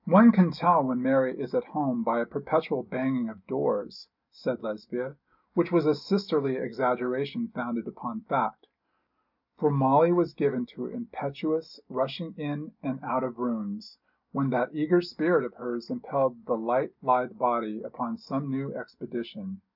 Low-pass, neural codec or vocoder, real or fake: 5.4 kHz; none; real